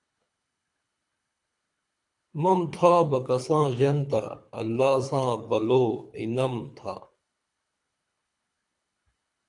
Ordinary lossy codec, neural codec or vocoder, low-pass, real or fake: AAC, 64 kbps; codec, 24 kHz, 3 kbps, HILCodec; 10.8 kHz; fake